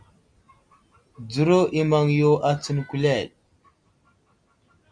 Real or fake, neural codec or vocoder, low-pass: real; none; 9.9 kHz